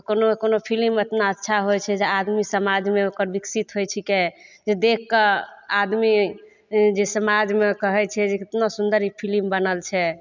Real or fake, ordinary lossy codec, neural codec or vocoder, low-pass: real; none; none; 7.2 kHz